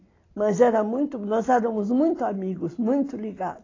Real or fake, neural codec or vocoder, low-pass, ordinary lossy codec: real; none; 7.2 kHz; AAC, 32 kbps